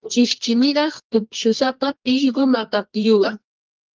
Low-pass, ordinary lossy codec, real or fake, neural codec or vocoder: 7.2 kHz; Opus, 24 kbps; fake; codec, 24 kHz, 0.9 kbps, WavTokenizer, medium music audio release